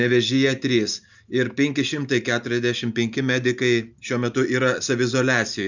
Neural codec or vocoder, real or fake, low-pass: none; real; 7.2 kHz